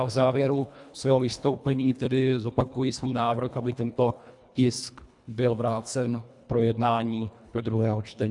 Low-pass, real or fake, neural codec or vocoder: 10.8 kHz; fake; codec, 24 kHz, 1.5 kbps, HILCodec